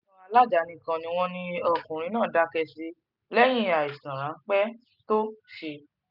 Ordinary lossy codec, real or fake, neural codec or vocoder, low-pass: none; real; none; 5.4 kHz